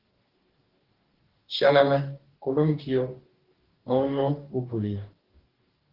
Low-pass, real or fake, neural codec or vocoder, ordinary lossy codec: 5.4 kHz; fake; codec, 44.1 kHz, 2.6 kbps, DAC; Opus, 16 kbps